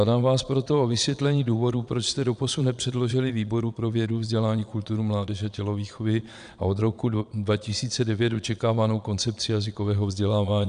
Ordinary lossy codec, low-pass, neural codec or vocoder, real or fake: MP3, 96 kbps; 9.9 kHz; vocoder, 22.05 kHz, 80 mel bands, Vocos; fake